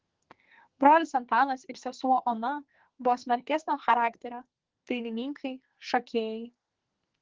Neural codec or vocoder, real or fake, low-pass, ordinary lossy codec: codec, 44.1 kHz, 2.6 kbps, SNAC; fake; 7.2 kHz; Opus, 16 kbps